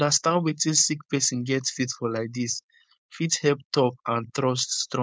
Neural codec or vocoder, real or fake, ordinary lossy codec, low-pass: codec, 16 kHz, 4.8 kbps, FACodec; fake; none; none